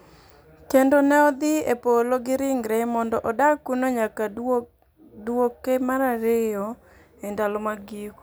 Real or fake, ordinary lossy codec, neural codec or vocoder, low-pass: real; none; none; none